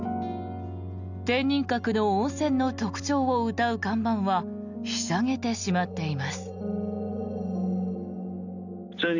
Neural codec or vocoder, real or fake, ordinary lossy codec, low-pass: none; real; none; 7.2 kHz